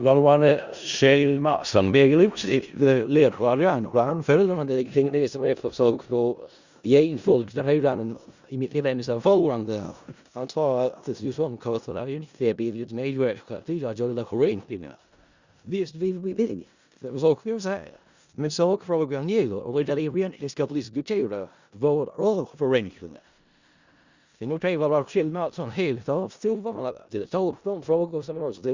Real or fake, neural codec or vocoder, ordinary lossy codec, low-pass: fake; codec, 16 kHz in and 24 kHz out, 0.4 kbps, LongCat-Audio-Codec, four codebook decoder; Opus, 64 kbps; 7.2 kHz